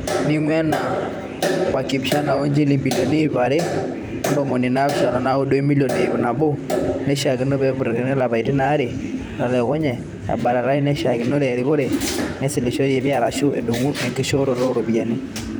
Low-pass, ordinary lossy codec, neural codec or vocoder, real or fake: none; none; vocoder, 44.1 kHz, 128 mel bands, Pupu-Vocoder; fake